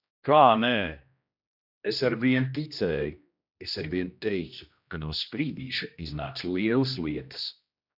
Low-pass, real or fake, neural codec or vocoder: 5.4 kHz; fake; codec, 16 kHz, 1 kbps, X-Codec, HuBERT features, trained on general audio